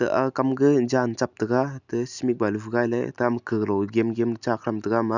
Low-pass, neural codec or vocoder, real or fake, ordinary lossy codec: 7.2 kHz; none; real; none